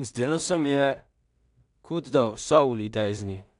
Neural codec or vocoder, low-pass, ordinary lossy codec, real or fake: codec, 16 kHz in and 24 kHz out, 0.4 kbps, LongCat-Audio-Codec, two codebook decoder; 10.8 kHz; none; fake